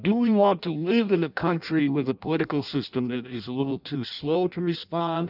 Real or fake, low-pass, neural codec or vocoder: fake; 5.4 kHz; codec, 16 kHz in and 24 kHz out, 0.6 kbps, FireRedTTS-2 codec